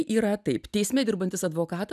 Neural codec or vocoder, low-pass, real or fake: none; 14.4 kHz; real